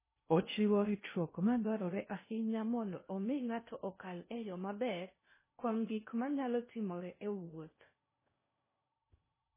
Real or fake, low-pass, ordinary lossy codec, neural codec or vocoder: fake; 3.6 kHz; MP3, 16 kbps; codec, 16 kHz in and 24 kHz out, 0.6 kbps, FocalCodec, streaming, 2048 codes